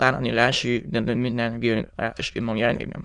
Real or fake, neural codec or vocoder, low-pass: fake; autoencoder, 22.05 kHz, a latent of 192 numbers a frame, VITS, trained on many speakers; 9.9 kHz